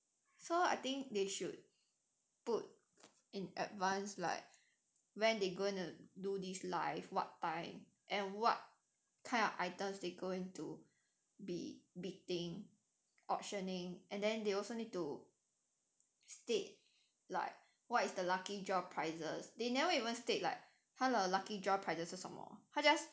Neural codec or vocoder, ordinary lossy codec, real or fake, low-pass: none; none; real; none